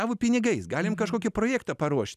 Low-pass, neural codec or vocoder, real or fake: 14.4 kHz; none; real